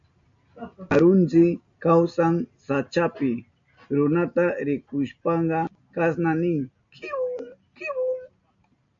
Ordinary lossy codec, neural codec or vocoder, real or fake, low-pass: MP3, 48 kbps; none; real; 7.2 kHz